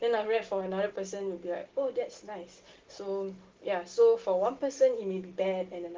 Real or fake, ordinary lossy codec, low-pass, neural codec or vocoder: real; Opus, 16 kbps; 7.2 kHz; none